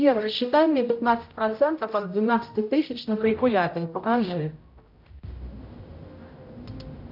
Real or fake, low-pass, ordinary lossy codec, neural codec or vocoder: fake; 5.4 kHz; AAC, 48 kbps; codec, 16 kHz, 0.5 kbps, X-Codec, HuBERT features, trained on general audio